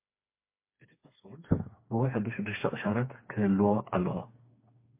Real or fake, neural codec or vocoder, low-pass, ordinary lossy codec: fake; codec, 16 kHz, 4 kbps, FreqCodec, smaller model; 3.6 kHz; MP3, 24 kbps